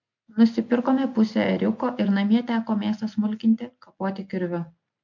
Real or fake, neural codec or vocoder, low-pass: real; none; 7.2 kHz